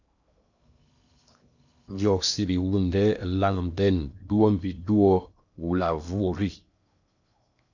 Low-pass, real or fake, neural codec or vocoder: 7.2 kHz; fake; codec, 16 kHz in and 24 kHz out, 0.8 kbps, FocalCodec, streaming, 65536 codes